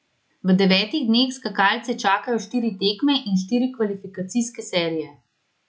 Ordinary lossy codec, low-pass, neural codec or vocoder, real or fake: none; none; none; real